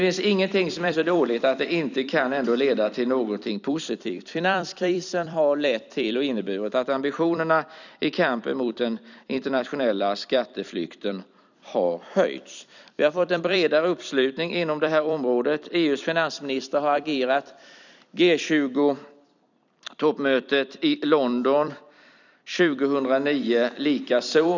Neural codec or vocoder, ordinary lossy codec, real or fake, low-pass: vocoder, 44.1 kHz, 128 mel bands every 256 samples, BigVGAN v2; none; fake; 7.2 kHz